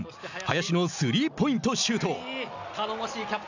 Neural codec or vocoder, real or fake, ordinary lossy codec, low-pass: none; real; none; 7.2 kHz